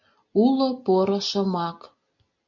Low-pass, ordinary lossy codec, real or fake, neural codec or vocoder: 7.2 kHz; MP3, 64 kbps; real; none